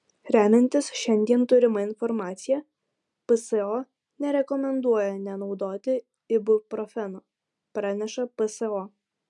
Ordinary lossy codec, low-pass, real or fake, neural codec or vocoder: MP3, 96 kbps; 10.8 kHz; real; none